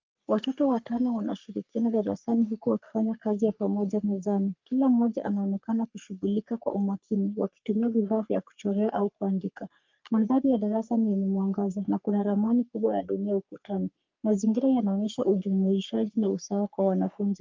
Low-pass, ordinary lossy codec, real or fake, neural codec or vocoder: 7.2 kHz; Opus, 24 kbps; fake; codec, 44.1 kHz, 3.4 kbps, Pupu-Codec